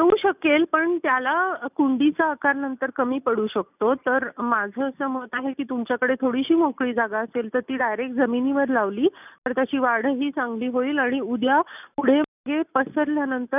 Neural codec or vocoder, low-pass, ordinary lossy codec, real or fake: none; 3.6 kHz; none; real